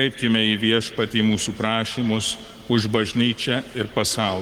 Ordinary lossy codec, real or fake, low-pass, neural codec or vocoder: Opus, 24 kbps; fake; 19.8 kHz; codec, 44.1 kHz, 7.8 kbps, Pupu-Codec